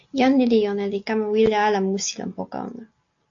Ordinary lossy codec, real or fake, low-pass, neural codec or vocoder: AAC, 48 kbps; real; 7.2 kHz; none